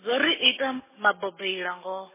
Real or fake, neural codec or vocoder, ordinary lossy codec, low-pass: real; none; MP3, 16 kbps; 3.6 kHz